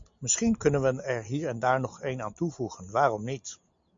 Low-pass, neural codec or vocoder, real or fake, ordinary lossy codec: 7.2 kHz; none; real; MP3, 48 kbps